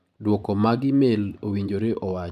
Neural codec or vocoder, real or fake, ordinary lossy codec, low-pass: vocoder, 44.1 kHz, 128 mel bands every 512 samples, BigVGAN v2; fake; none; 14.4 kHz